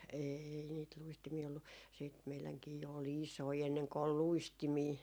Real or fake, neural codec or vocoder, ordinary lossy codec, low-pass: real; none; none; none